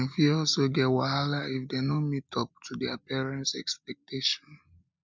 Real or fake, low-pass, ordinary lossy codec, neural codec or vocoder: real; none; none; none